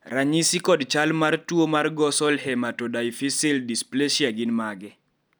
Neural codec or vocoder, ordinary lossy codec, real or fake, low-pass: none; none; real; none